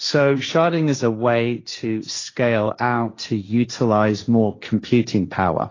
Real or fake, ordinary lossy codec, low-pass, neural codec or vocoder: fake; AAC, 32 kbps; 7.2 kHz; codec, 16 kHz, 1.1 kbps, Voila-Tokenizer